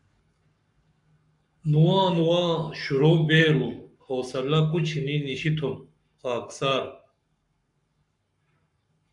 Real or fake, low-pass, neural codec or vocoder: fake; 10.8 kHz; codec, 44.1 kHz, 7.8 kbps, Pupu-Codec